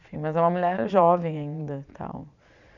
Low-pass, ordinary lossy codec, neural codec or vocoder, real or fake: 7.2 kHz; none; vocoder, 22.05 kHz, 80 mel bands, Vocos; fake